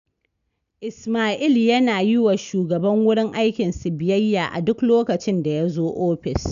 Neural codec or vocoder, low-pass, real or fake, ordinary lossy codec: none; 7.2 kHz; real; none